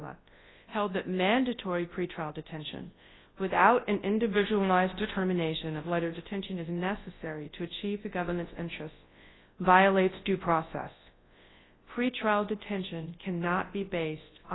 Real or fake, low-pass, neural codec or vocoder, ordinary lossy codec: fake; 7.2 kHz; codec, 24 kHz, 0.9 kbps, WavTokenizer, large speech release; AAC, 16 kbps